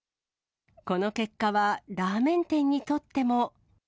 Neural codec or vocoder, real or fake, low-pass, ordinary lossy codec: none; real; none; none